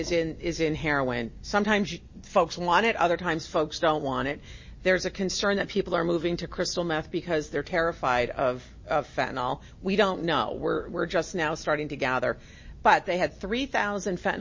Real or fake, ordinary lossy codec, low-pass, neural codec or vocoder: real; MP3, 32 kbps; 7.2 kHz; none